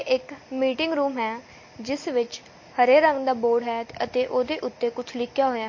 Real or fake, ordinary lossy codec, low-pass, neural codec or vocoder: real; MP3, 32 kbps; 7.2 kHz; none